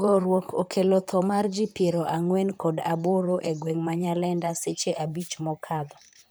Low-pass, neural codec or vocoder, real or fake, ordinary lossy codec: none; vocoder, 44.1 kHz, 128 mel bands, Pupu-Vocoder; fake; none